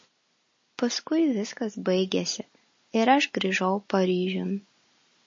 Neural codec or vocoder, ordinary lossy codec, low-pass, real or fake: none; MP3, 32 kbps; 7.2 kHz; real